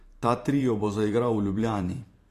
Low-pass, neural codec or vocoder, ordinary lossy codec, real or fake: 14.4 kHz; vocoder, 44.1 kHz, 128 mel bands every 512 samples, BigVGAN v2; AAC, 48 kbps; fake